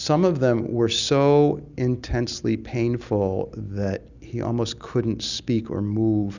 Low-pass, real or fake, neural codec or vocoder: 7.2 kHz; real; none